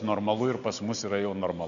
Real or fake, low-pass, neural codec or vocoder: real; 7.2 kHz; none